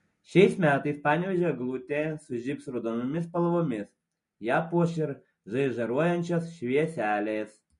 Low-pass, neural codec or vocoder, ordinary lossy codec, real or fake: 10.8 kHz; none; MP3, 48 kbps; real